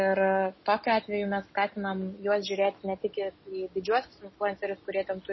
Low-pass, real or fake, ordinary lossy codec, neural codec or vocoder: 7.2 kHz; real; MP3, 24 kbps; none